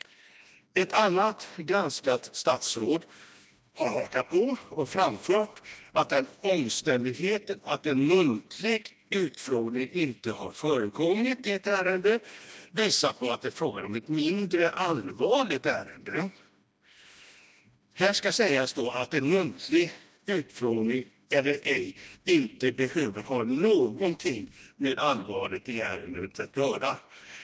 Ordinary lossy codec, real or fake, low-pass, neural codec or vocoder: none; fake; none; codec, 16 kHz, 1 kbps, FreqCodec, smaller model